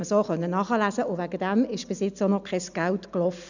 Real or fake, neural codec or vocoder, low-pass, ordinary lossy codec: real; none; 7.2 kHz; none